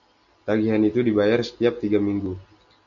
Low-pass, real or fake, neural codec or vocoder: 7.2 kHz; real; none